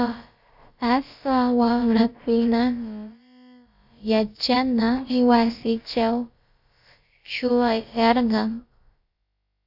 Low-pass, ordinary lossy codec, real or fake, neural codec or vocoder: 5.4 kHz; Opus, 64 kbps; fake; codec, 16 kHz, about 1 kbps, DyCAST, with the encoder's durations